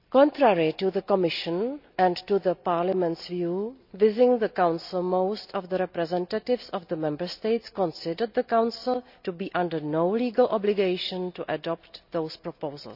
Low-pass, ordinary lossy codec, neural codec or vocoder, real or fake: 5.4 kHz; none; none; real